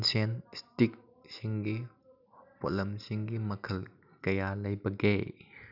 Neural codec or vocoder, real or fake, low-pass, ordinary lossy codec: none; real; 5.4 kHz; none